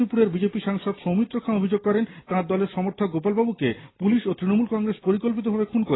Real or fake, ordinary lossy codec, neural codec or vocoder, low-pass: real; AAC, 16 kbps; none; 7.2 kHz